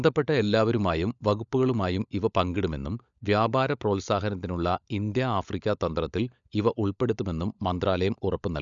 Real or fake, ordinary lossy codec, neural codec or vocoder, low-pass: fake; none; codec, 16 kHz, 16 kbps, FunCodec, trained on LibriTTS, 50 frames a second; 7.2 kHz